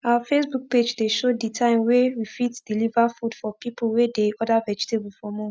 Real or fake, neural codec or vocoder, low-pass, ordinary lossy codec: real; none; none; none